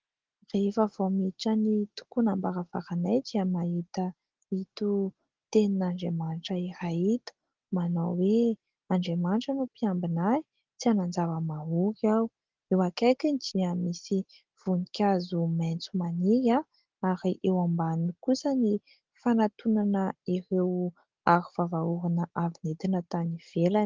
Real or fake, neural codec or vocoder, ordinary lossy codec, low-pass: real; none; Opus, 16 kbps; 7.2 kHz